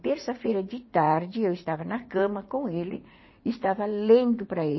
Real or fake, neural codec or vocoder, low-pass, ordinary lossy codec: fake; vocoder, 44.1 kHz, 80 mel bands, Vocos; 7.2 kHz; MP3, 24 kbps